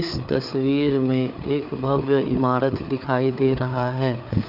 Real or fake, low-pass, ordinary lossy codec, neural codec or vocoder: fake; 5.4 kHz; none; codec, 16 kHz, 4 kbps, FreqCodec, larger model